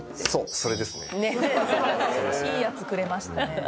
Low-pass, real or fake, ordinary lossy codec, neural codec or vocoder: none; real; none; none